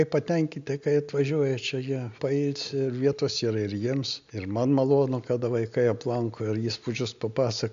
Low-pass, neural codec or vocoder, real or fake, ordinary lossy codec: 7.2 kHz; none; real; AAC, 64 kbps